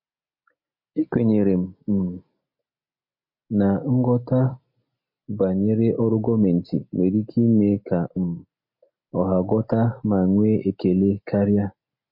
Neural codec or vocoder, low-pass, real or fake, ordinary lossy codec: none; 5.4 kHz; real; MP3, 32 kbps